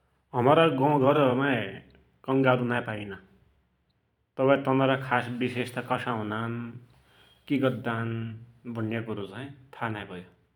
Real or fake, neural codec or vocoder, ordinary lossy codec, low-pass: fake; vocoder, 44.1 kHz, 128 mel bands every 512 samples, BigVGAN v2; none; 19.8 kHz